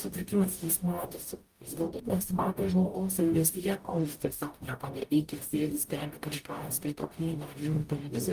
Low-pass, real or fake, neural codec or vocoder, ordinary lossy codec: 14.4 kHz; fake; codec, 44.1 kHz, 0.9 kbps, DAC; Opus, 32 kbps